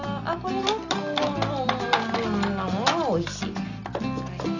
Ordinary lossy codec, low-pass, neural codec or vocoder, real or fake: none; 7.2 kHz; none; real